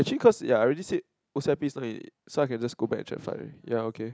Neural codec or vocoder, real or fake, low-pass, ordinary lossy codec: none; real; none; none